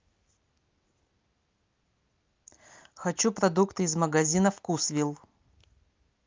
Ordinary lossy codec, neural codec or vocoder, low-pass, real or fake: Opus, 24 kbps; none; 7.2 kHz; real